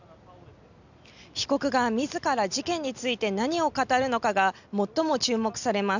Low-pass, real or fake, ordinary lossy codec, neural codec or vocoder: 7.2 kHz; real; none; none